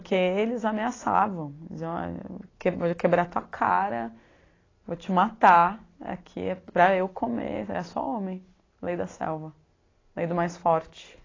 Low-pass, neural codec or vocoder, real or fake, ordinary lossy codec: 7.2 kHz; none; real; AAC, 32 kbps